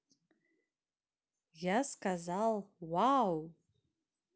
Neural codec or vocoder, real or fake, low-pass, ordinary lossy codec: none; real; none; none